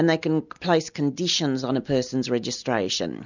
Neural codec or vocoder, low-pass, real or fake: none; 7.2 kHz; real